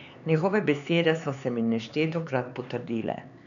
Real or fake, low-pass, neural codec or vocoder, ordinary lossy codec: fake; 7.2 kHz; codec, 16 kHz, 4 kbps, X-Codec, HuBERT features, trained on LibriSpeech; none